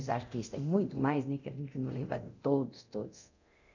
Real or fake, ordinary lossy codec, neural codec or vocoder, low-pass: fake; none; codec, 24 kHz, 0.9 kbps, DualCodec; 7.2 kHz